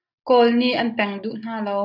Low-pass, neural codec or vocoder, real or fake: 5.4 kHz; none; real